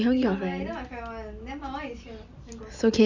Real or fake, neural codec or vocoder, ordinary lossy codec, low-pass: real; none; none; 7.2 kHz